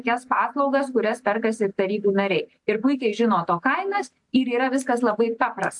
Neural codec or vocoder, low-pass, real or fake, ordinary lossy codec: none; 10.8 kHz; real; AAC, 64 kbps